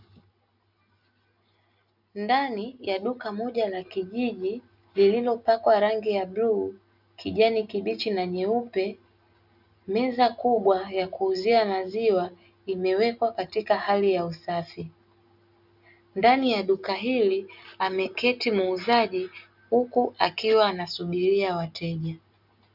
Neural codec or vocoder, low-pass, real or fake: none; 5.4 kHz; real